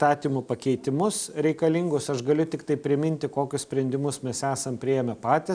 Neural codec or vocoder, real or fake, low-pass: none; real; 9.9 kHz